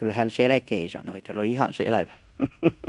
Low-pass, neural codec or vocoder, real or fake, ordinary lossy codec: 10.8 kHz; codec, 16 kHz in and 24 kHz out, 0.9 kbps, LongCat-Audio-Codec, fine tuned four codebook decoder; fake; none